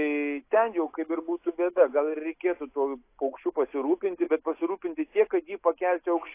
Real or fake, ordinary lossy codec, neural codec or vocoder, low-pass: real; AAC, 24 kbps; none; 3.6 kHz